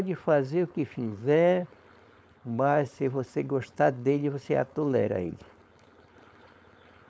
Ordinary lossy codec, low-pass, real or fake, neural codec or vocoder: none; none; fake; codec, 16 kHz, 4.8 kbps, FACodec